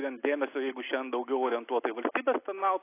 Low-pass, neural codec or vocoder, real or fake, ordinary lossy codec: 3.6 kHz; none; real; AAC, 24 kbps